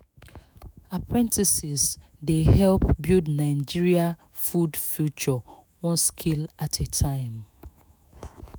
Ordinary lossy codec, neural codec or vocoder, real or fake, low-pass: none; autoencoder, 48 kHz, 128 numbers a frame, DAC-VAE, trained on Japanese speech; fake; none